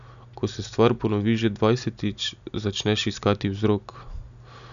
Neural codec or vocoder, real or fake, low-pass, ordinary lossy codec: none; real; 7.2 kHz; none